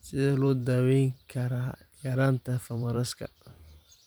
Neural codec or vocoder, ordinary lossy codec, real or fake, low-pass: vocoder, 44.1 kHz, 128 mel bands every 512 samples, BigVGAN v2; none; fake; none